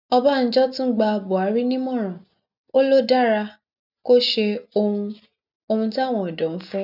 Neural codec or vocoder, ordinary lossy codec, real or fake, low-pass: none; none; real; 5.4 kHz